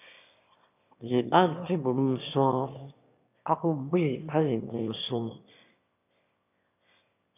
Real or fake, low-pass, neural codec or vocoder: fake; 3.6 kHz; autoencoder, 22.05 kHz, a latent of 192 numbers a frame, VITS, trained on one speaker